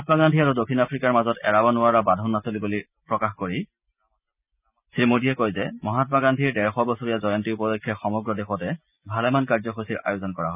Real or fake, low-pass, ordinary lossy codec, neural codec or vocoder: real; 3.6 kHz; none; none